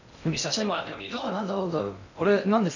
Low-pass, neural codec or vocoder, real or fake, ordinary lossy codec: 7.2 kHz; codec, 16 kHz in and 24 kHz out, 0.6 kbps, FocalCodec, streaming, 2048 codes; fake; none